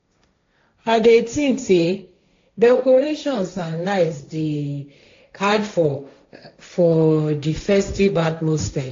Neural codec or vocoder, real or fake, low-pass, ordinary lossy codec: codec, 16 kHz, 1.1 kbps, Voila-Tokenizer; fake; 7.2 kHz; AAC, 48 kbps